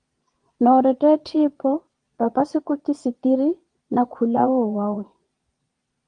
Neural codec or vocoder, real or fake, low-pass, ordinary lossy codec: vocoder, 22.05 kHz, 80 mel bands, Vocos; fake; 9.9 kHz; Opus, 24 kbps